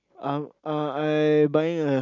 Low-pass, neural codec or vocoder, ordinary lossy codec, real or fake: 7.2 kHz; none; none; real